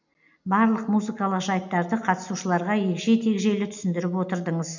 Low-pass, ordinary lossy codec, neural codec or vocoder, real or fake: 7.2 kHz; none; none; real